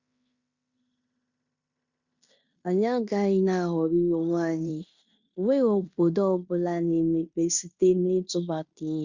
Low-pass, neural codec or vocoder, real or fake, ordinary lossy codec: 7.2 kHz; codec, 16 kHz in and 24 kHz out, 0.9 kbps, LongCat-Audio-Codec, fine tuned four codebook decoder; fake; Opus, 64 kbps